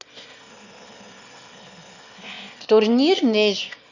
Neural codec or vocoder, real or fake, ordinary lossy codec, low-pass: autoencoder, 22.05 kHz, a latent of 192 numbers a frame, VITS, trained on one speaker; fake; Opus, 64 kbps; 7.2 kHz